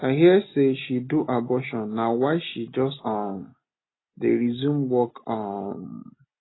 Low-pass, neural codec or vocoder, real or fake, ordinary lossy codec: 7.2 kHz; none; real; AAC, 16 kbps